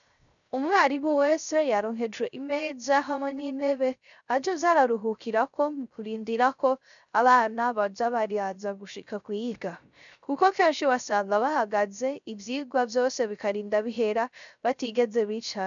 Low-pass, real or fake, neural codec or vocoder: 7.2 kHz; fake; codec, 16 kHz, 0.3 kbps, FocalCodec